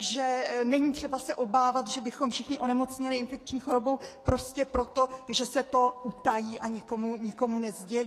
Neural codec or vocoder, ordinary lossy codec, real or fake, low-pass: codec, 44.1 kHz, 2.6 kbps, SNAC; AAC, 48 kbps; fake; 14.4 kHz